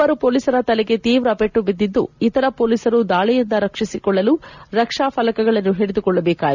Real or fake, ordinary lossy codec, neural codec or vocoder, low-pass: real; none; none; 7.2 kHz